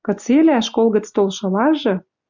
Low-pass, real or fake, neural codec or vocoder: 7.2 kHz; real; none